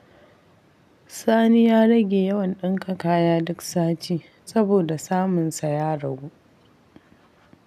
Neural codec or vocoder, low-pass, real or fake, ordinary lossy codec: none; 14.4 kHz; real; none